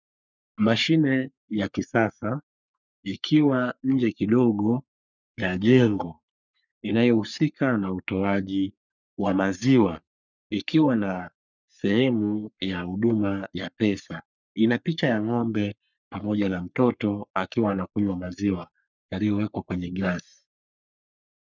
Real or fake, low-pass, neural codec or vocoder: fake; 7.2 kHz; codec, 44.1 kHz, 3.4 kbps, Pupu-Codec